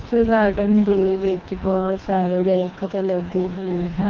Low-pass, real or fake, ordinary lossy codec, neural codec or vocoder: 7.2 kHz; fake; Opus, 24 kbps; codec, 24 kHz, 1.5 kbps, HILCodec